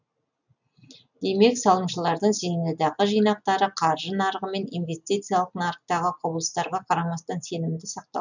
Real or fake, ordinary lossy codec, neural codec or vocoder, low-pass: real; none; none; 7.2 kHz